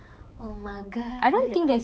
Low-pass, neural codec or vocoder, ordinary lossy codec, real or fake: none; codec, 16 kHz, 4 kbps, X-Codec, HuBERT features, trained on balanced general audio; none; fake